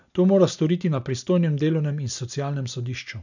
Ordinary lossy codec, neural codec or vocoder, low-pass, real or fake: none; none; 7.2 kHz; real